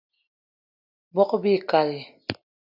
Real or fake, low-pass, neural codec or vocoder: real; 5.4 kHz; none